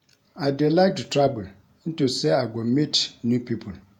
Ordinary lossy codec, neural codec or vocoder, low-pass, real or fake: none; vocoder, 48 kHz, 128 mel bands, Vocos; 19.8 kHz; fake